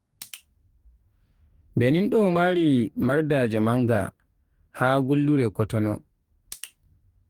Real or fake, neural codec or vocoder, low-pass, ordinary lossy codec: fake; codec, 44.1 kHz, 2.6 kbps, DAC; 19.8 kHz; Opus, 32 kbps